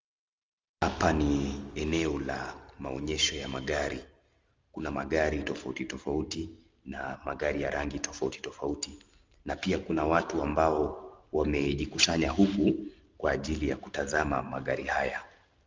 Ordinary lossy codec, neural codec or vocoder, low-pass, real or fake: Opus, 32 kbps; none; 7.2 kHz; real